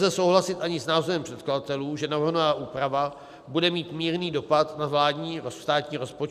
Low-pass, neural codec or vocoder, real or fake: 14.4 kHz; none; real